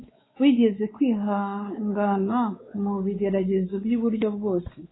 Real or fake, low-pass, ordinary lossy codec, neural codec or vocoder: fake; 7.2 kHz; AAC, 16 kbps; codec, 16 kHz, 4 kbps, X-Codec, WavLM features, trained on Multilingual LibriSpeech